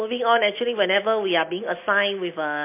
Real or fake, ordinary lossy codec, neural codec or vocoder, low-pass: real; MP3, 24 kbps; none; 3.6 kHz